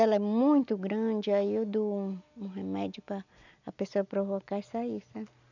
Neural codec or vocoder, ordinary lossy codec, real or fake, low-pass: none; none; real; 7.2 kHz